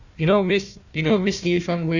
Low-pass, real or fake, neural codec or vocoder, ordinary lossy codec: 7.2 kHz; fake; codec, 16 kHz, 1 kbps, FunCodec, trained on Chinese and English, 50 frames a second; none